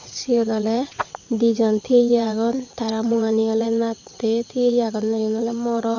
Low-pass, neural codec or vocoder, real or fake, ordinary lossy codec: 7.2 kHz; vocoder, 22.05 kHz, 80 mel bands, WaveNeXt; fake; none